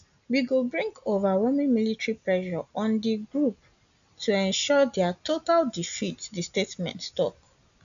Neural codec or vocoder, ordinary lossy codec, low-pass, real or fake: none; none; 7.2 kHz; real